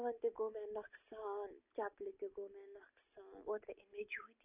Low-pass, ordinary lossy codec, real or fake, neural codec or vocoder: 3.6 kHz; none; real; none